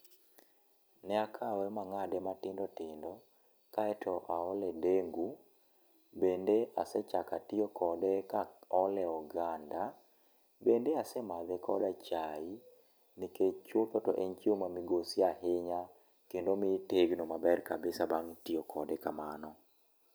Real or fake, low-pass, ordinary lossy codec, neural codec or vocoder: real; none; none; none